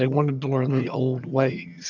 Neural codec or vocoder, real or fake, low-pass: vocoder, 22.05 kHz, 80 mel bands, HiFi-GAN; fake; 7.2 kHz